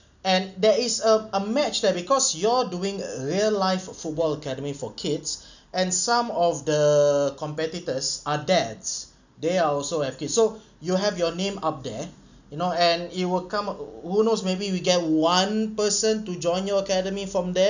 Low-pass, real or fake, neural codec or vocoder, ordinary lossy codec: 7.2 kHz; real; none; none